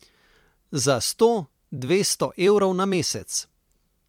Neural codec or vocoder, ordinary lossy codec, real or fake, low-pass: vocoder, 44.1 kHz, 128 mel bands every 256 samples, BigVGAN v2; MP3, 96 kbps; fake; 19.8 kHz